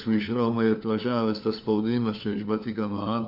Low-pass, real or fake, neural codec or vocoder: 5.4 kHz; fake; codec, 16 kHz, 4 kbps, FunCodec, trained on LibriTTS, 50 frames a second